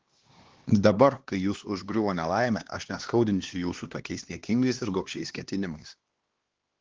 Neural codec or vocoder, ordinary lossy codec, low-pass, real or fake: codec, 16 kHz, 2 kbps, X-Codec, HuBERT features, trained on LibriSpeech; Opus, 16 kbps; 7.2 kHz; fake